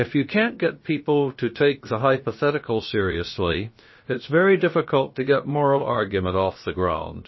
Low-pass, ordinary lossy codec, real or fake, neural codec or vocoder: 7.2 kHz; MP3, 24 kbps; fake; codec, 16 kHz, about 1 kbps, DyCAST, with the encoder's durations